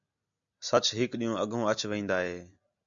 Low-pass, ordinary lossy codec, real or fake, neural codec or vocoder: 7.2 kHz; AAC, 64 kbps; real; none